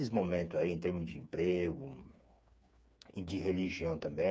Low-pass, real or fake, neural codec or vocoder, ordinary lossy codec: none; fake; codec, 16 kHz, 4 kbps, FreqCodec, smaller model; none